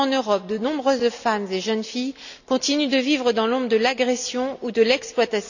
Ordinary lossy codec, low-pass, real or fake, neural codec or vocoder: none; 7.2 kHz; real; none